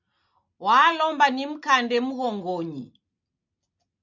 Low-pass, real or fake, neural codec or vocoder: 7.2 kHz; real; none